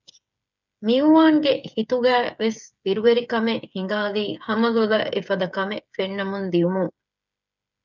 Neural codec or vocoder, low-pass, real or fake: codec, 16 kHz, 8 kbps, FreqCodec, smaller model; 7.2 kHz; fake